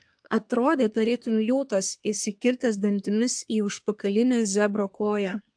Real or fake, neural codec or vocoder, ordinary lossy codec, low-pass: fake; codec, 24 kHz, 1 kbps, SNAC; AAC, 64 kbps; 9.9 kHz